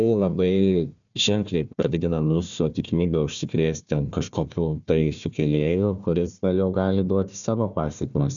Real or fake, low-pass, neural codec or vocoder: fake; 7.2 kHz; codec, 16 kHz, 1 kbps, FunCodec, trained on Chinese and English, 50 frames a second